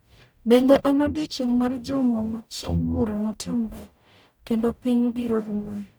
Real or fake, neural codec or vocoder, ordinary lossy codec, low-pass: fake; codec, 44.1 kHz, 0.9 kbps, DAC; none; none